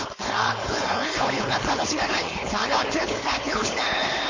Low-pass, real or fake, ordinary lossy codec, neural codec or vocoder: 7.2 kHz; fake; MP3, 32 kbps; codec, 16 kHz, 4.8 kbps, FACodec